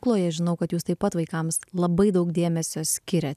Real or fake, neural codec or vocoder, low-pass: real; none; 14.4 kHz